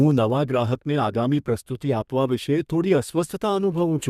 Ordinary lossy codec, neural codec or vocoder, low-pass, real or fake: none; codec, 32 kHz, 1.9 kbps, SNAC; 14.4 kHz; fake